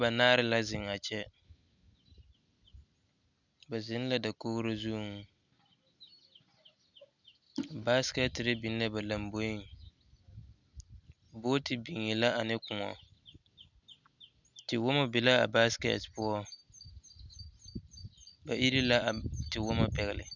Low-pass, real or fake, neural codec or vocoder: 7.2 kHz; real; none